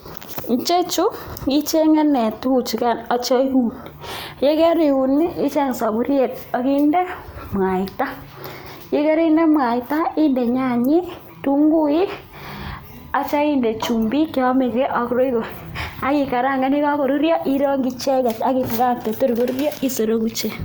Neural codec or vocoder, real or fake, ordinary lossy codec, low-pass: none; real; none; none